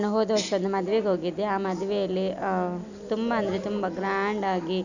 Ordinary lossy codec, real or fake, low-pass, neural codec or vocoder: none; real; 7.2 kHz; none